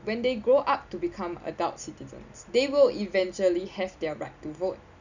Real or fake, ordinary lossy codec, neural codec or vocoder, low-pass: real; none; none; 7.2 kHz